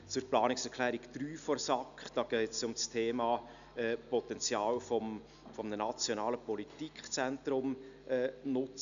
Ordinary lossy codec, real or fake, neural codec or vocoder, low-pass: none; real; none; 7.2 kHz